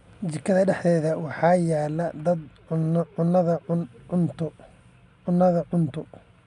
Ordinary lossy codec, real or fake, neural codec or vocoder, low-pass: none; real; none; 10.8 kHz